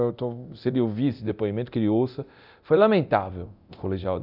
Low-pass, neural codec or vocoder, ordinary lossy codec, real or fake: 5.4 kHz; codec, 24 kHz, 0.9 kbps, DualCodec; none; fake